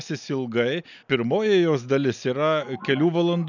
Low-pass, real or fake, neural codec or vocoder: 7.2 kHz; real; none